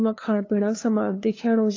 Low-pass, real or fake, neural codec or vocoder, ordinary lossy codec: 7.2 kHz; fake; codec, 16 kHz, 2 kbps, FunCodec, trained on LibriTTS, 25 frames a second; AAC, 32 kbps